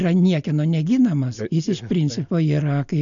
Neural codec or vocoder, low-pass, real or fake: none; 7.2 kHz; real